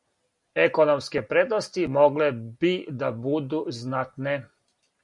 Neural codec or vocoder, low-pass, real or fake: none; 10.8 kHz; real